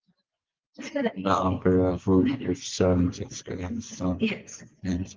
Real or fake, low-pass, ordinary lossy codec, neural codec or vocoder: fake; 7.2 kHz; Opus, 32 kbps; vocoder, 24 kHz, 100 mel bands, Vocos